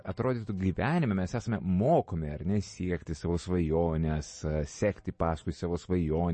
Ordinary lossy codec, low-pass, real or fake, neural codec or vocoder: MP3, 32 kbps; 10.8 kHz; real; none